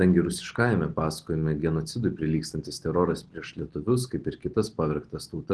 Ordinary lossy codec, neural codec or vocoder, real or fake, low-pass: Opus, 16 kbps; none; real; 10.8 kHz